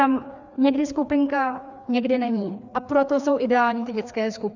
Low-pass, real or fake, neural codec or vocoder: 7.2 kHz; fake; codec, 16 kHz, 2 kbps, FreqCodec, larger model